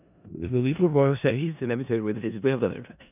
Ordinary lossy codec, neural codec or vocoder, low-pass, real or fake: none; codec, 16 kHz in and 24 kHz out, 0.4 kbps, LongCat-Audio-Codec, four codebook decoder; 3.6 kHz; fake